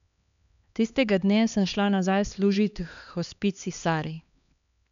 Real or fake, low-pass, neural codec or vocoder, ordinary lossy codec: fake; 7.2 kHz; codec, 16 kHz, 2 kbps, X-Codec, HuBERT features, trained on LibriSpeech; none